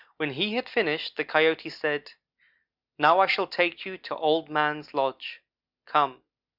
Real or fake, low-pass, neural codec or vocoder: real; 5.4 kHz; none